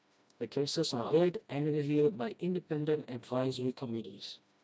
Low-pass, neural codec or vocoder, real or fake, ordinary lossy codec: none; codec, 16 kHz, 1 kbps, FreqCodec, smaller model; fake; none